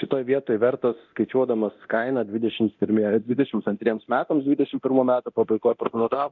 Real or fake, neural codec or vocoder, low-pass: fake; codec, 24 kHz, 0.9 kbps, DualCodec; 7.2 kHz